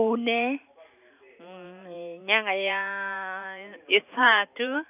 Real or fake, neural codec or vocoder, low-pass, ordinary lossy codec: fake; vocoder, 44.1 kHz, 128 mel bands every 512 samples, BigVGAN v2; 3.6 kHz; none